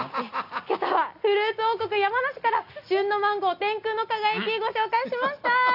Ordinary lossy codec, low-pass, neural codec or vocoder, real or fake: none; 5.4 kHz; none; real